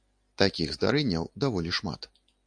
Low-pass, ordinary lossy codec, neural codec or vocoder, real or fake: 9.9 kHz; AAC, 64 kbps; none; real